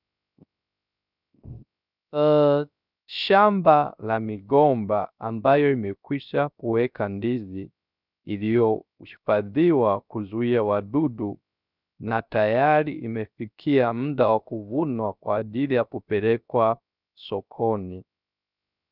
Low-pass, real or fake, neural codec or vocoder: 5.4 kHz; fake; codec, 16 kHz, 0.3 kbps, FocalCodec